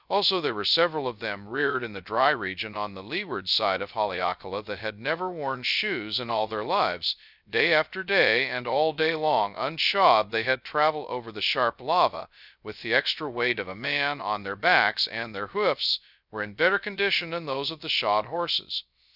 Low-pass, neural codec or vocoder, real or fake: 5.4 kHz; codec, 16 kHz, 0.2 kbps, FocalCodec; fake